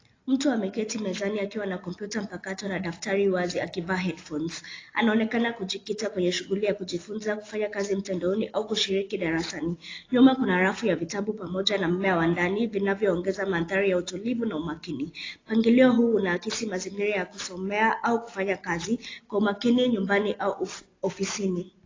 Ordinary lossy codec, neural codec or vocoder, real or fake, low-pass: AAC, 32 kbps; none; real; 7.2 kHz